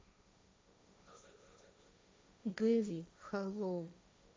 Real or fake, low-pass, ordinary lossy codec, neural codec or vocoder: fake; none; none; codec, 16 kHz, 1.1 kbps, Voila-Tokenizer